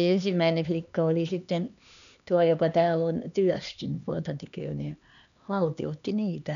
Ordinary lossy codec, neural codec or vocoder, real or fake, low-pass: none; codec, 16 kHz, 2 kbps, X-Codec, HuBERT features, trained on LibriSpeech; fake; 7.2 kHz